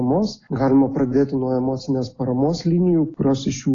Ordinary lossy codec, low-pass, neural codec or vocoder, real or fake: AAC, 32 kbps; 7.2 kHz; none; real